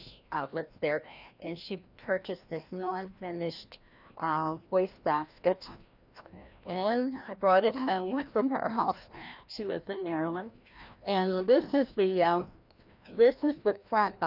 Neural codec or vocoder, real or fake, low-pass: codec, 16 kHz, 1 kbps, FreqCodec, larger model; fake; 5.4 kHz